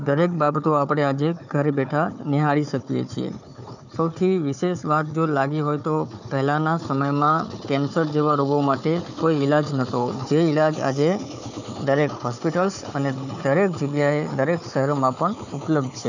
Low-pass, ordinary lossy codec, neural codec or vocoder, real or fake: 7.2 kHz; none; codec, 16 kHz, 4 kbps, FunCodec, trained on Chinese and English, 50 frames a second; fake